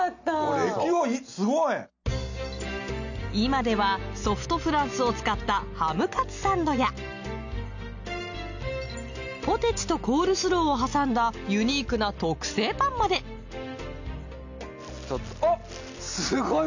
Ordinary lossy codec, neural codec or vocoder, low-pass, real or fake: none; none; 7.2 kHz; real